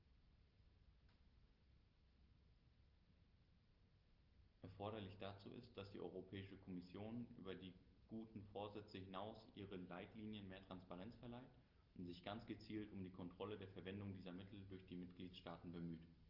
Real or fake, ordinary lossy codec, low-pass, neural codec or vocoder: real; Opus, 32 kbps; 5.4 kHz; none